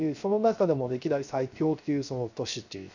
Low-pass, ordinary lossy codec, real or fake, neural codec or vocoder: 7.2 kHz; none; fake; codec, 16 kHz, 0.3 kbps, FocalCodec